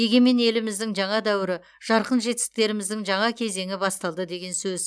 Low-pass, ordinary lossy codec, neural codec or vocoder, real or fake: none; none; none; real